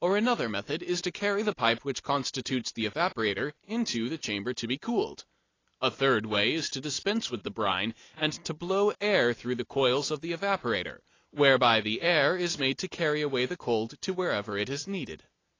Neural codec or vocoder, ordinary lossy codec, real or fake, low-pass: none; AAC, 32 kbps; real; 7.2 kHz